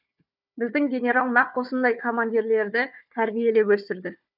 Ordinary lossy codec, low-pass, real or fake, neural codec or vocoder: none; 5.4 kHz; fake; codec, 16 kHz, 4 kbps, FunCodec, trained on Chinese and English, 50 frames a second